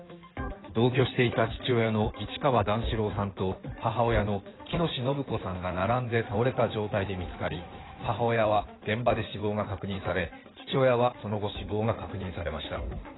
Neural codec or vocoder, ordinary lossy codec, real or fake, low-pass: codec, 16 kHz in and 24 kHz out, 2.2 kbps, FireRedTTS-2 codec; AAC, 16 kbps; fake; 7.2 kHz